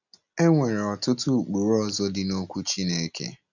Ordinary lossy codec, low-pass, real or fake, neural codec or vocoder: none; 7.2 kHz; real; none